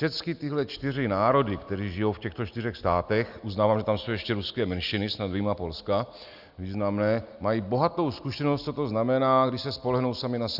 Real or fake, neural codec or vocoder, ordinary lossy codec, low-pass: real; none; Opus, 64 kbps; 5.4 kHz